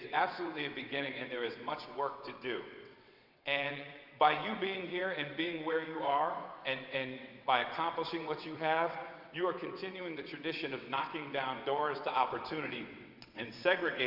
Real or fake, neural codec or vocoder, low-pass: fake; vocoder, 22.05 kHz, 80 mel bands, WaveNeXt; 5.4 kHz